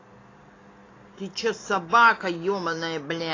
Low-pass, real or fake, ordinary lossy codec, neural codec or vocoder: 7.2 kHz; real; AAC, 32 kbps; none